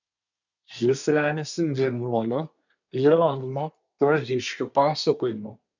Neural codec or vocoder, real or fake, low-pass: codec, 24 kHz, 1 kbps, SNAC; fake; 7.2 kHz